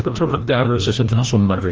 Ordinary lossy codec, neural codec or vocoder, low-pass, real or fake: Opus, 24 kbps; codec, 16 kHz, 1 kbps, FreqCodec, larger model; 7.2 kHz; fake